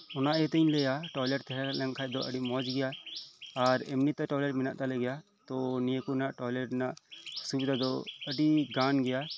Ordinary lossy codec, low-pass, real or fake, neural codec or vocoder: none; none; real; none